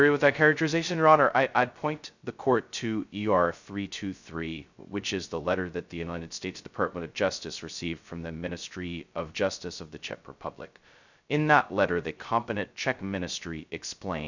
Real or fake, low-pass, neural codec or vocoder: fake; 7.2 kHz; codec, 16 kHz, 0.2 kbps, FocalCodec